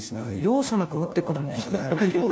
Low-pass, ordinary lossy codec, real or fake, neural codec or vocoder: none; none; fake; codec, 16 kHz, 1 kbps, FunCodec, trained on LibriTTS, 50 frames a second